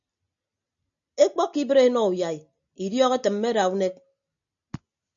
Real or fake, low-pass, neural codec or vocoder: real; 7.2 kHz; none